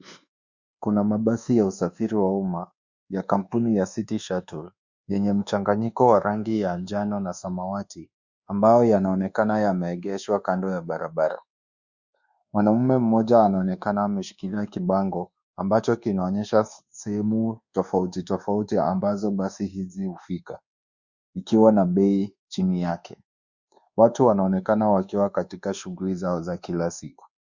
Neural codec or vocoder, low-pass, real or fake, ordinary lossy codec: codec, 24 kHz, 1.2 kbps, DualCodec; 7.2 kHz; fake; Opus, 64 kbps